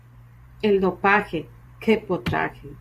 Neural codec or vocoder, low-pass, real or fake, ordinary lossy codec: vocoder, 44.1 kHz, 128 mel bands every 512 samples, BigVGAN v2; 14.4 kHz; fake; Opus, 64 kbps